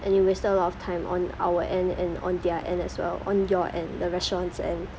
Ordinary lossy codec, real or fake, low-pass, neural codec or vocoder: none; real; none; none